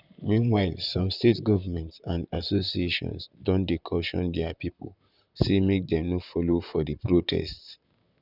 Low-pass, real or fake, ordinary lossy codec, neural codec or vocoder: 5.4 kHz; fake; none; vocoder, 44.1 kHz, 128 mel bands, Pupu-Vocoder